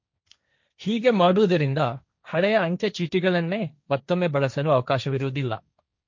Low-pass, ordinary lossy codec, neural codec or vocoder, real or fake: 7.2 kHz; MP3, 48 kbps; codec, 16 kHz, 1.1 kbps, Voila-Tokenizer; fake